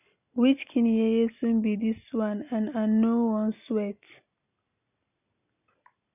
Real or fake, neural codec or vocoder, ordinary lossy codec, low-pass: real; none; none; 3.6 kHz